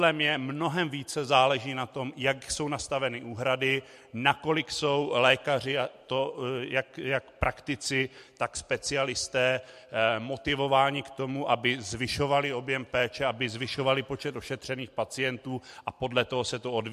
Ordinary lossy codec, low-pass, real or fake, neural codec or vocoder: MP3, 64 kbps; 14.4 kHz; real; none